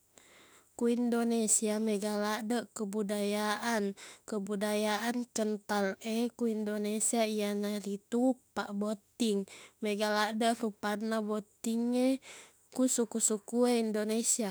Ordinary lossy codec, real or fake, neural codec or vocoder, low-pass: none; fake; autoencoder, 48 kHz, 32 numbers a frame, DAC-VAE, trained on Japanese speech; none